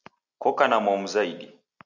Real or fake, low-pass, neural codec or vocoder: real; 7.2 kHz; none